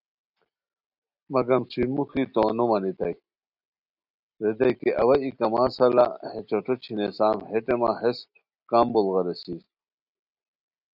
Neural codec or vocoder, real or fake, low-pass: none; real; 5.4 kHz